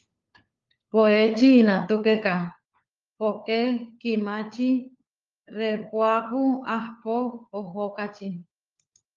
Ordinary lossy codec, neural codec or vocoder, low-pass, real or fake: Opus, 32 kbps; codec, 16 kHz, 4 kbps, FunCodec, trained on LibriTTS, 50 frames a second; 7.2 kHz; fake